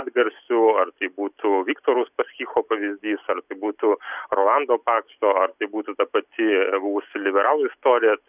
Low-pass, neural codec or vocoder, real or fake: 3.6 kHz; none; real